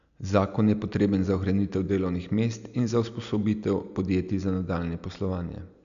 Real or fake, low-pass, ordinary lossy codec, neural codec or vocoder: real; 7.2 kHz; none; none